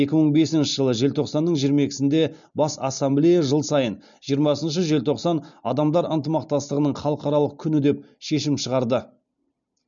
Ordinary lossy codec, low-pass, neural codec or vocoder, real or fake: none; 7.2 kHz; none; real